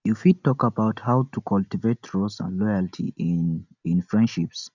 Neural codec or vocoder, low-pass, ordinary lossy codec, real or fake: none; 7.2 kHz; none; real